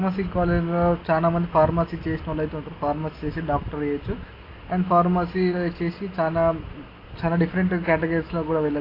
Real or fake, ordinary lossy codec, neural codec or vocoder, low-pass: real; AAC, 24 kbps; none; 5.4 kHz